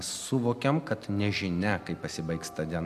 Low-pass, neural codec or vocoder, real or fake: 14.4 kHz; none; real